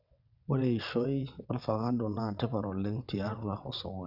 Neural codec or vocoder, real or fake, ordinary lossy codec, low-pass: vocoder, 22.05 kHz, 80 mel bands, WaveNeXt; fake; none; 5.4 kHz